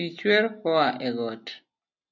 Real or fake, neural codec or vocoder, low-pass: real; none; 7.2 kHz